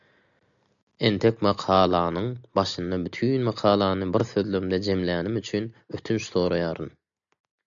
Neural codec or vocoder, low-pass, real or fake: none; 7.2 kHz; real